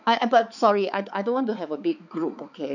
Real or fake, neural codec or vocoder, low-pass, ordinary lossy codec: fake; codec, 16 kHz, 4 kbps, X-Codec, HuBERT features, trained on balanced general audio; 7.2 kHz; none